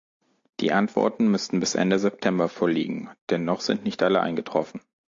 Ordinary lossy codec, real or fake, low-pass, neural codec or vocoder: AAC, 48 kbps; real; 7.2 kHz; none